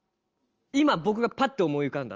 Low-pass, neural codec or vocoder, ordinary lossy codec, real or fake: 7.2 kHz; none; Opus, 24 kbps; real